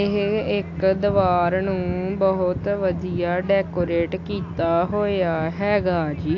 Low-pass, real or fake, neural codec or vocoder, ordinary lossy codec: 7.2 kHz; real; none; AAC, 48 kbps